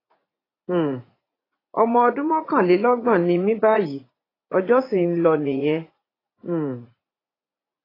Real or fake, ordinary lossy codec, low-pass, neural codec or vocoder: fake; AAC, 24 kbps; 5.4 kHz; vocoder, 44.1 kHz, 80 mel bands, Vocos